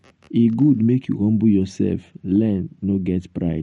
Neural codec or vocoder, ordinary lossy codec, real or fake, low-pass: none; MP3, 48 kbps; real; 19.8 kHz